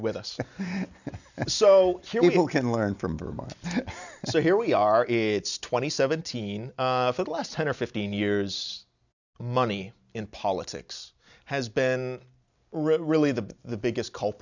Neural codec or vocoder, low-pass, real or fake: none; 7.2 kHz; real